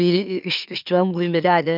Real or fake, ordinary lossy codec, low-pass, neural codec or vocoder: fake; none; 5.4 kHz; autoencoder, 44.1 kHz, a latent of 192 numbers a frame, MeloTTS